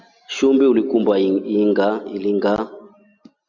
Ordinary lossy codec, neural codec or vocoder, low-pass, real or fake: Opus, 64 kbps; none; 7.2 kHz; real